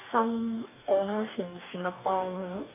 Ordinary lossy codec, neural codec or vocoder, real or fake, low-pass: none; codec, 44.1 kHz, 2.6 kbps, DAC; fake; 3.6 kHz